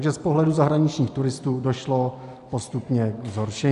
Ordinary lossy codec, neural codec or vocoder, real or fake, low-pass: AAC, 96 kbps; none; real; 10.8 kHz